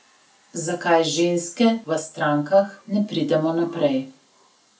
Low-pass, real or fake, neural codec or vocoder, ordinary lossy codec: none; real; none; none